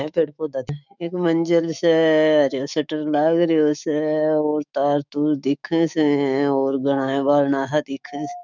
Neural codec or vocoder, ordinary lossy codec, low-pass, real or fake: autoencoder, 48 kHz, 128 numbers a frame, DAC-VAE, trained on Japanese speech; none; 7.2 kHz; fake